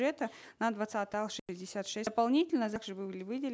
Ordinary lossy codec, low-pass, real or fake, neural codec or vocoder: none; none; real; none